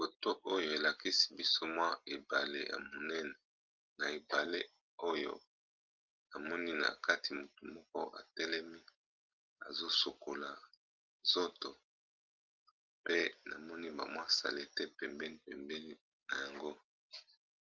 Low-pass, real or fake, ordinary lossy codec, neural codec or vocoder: 7.2 kHz; real; Opus, 24 kbps; none